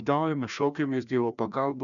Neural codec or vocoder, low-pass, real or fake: codec, 16 kHz, 1 kbps, FreqCodec, larger model; 7.2 kHz; fake